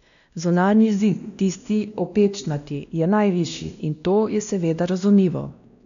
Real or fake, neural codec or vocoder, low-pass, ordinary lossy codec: fake; codec, 16 kHz, 1 kbps, X-Codec, WavLM features, trained on Multilingual LibriSpeech; 7.2 kHz; none